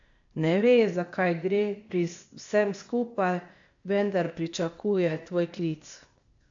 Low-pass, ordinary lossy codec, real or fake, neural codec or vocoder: 7.2 kHz; AAC, 64 kbps; fake; codec, 16 kHz, 0.8 kbps, ZipCodec